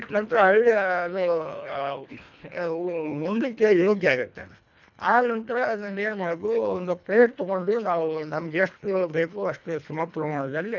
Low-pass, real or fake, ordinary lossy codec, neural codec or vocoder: 7.2 kHz; fake; none; codec, 24 kHz, 1.5 kbps, HILCodec